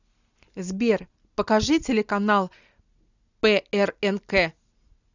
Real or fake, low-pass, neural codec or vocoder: real; 7.2 kHz; none